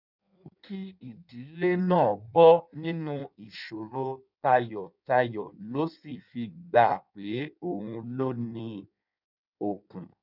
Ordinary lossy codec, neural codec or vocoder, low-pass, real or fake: none; codec, 16 kHz in and 24 kHz out, 1.1 kbps, FireRedTTS-2 codec; 5.4 kHz; fake